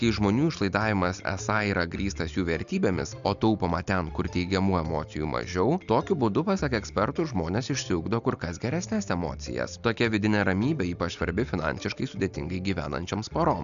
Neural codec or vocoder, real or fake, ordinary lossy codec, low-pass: none; real; MP3, 96 kbps; 7.2 kHz